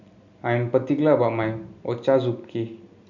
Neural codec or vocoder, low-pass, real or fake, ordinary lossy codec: none; 7.2 kHz; real; none